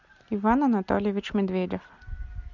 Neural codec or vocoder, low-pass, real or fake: none; 7.2 kHz; real